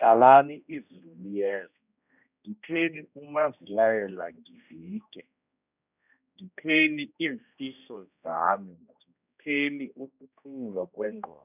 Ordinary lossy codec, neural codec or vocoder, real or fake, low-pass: none; codec, 16 kHz, 1 kbps, X-Codec, HuBERT features, trained on general audio; fake; 3.6 kHz